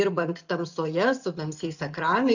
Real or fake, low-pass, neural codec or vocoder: real; 7.2 kHz; none